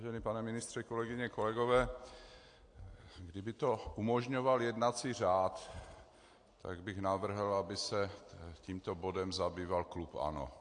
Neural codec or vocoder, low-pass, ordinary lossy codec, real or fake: none; 9.9 kHz; AAC, 48 kbps; real